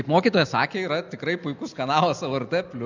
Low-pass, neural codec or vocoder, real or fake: 7.2 kHz; none; real